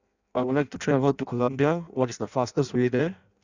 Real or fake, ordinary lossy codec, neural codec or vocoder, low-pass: fake; none; codec, 16 kHz in and 24 kHz out, 0.6 kbps, FireRedTTS-2 codec; 7.2 kHz